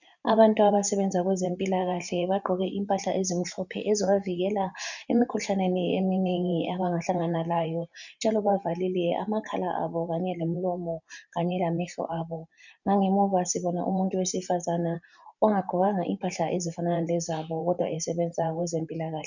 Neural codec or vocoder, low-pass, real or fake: vocoder, 44.1 kHz, 128 mel bands every 512 samples, BigVGAN v2; 7.2 kHz; fake